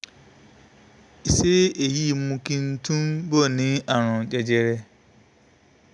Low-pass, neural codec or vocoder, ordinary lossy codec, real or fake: 10.8 kHz; none; none; real